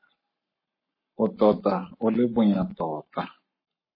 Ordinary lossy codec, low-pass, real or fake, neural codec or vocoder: MP3, 24 kbps; 5.4 kHz; fake; codec, 44.1 kHz, 7.8 kbps, Pupu-Codec